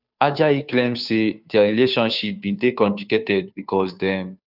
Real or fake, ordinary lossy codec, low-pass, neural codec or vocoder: fake; none; 5.4 kHz; codec, 16 kHz, 2 kbps, FunCodec, trained on Chinese and English, 25 frames a second